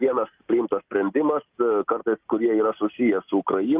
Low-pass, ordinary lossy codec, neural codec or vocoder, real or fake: 3.6 kHz; Opus, 24 kbps; none; real